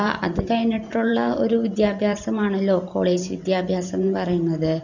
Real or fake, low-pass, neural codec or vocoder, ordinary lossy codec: fake; 7.2 kHz; vocoder, 44.1 kHz, 128 mel bands every 512 samples, BigVGAN v2; none